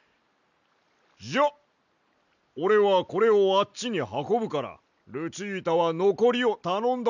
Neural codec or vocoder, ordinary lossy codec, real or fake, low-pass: none; none; real; 7.2 kHz